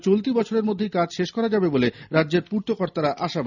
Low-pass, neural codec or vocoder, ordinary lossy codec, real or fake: 7.2 kHz; none; none; real